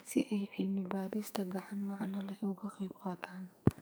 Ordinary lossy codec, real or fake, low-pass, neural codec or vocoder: none; fake; none; codec, 44.1 kHz, 2.6 kbps, SNAC